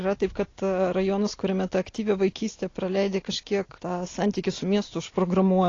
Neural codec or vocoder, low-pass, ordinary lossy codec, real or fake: none; 7.2 kHz; AAC, 32 kbps; real